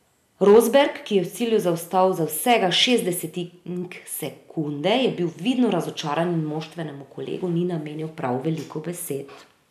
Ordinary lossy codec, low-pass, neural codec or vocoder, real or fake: none; 14.4 kHz; none; real